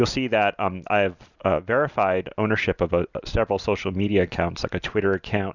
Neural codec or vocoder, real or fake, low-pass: none; real; 7.2 kHz